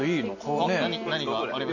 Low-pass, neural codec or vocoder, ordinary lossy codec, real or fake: 7.2 kHz; none; none; real